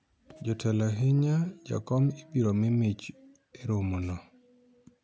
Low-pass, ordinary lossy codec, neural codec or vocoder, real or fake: none; none; none; real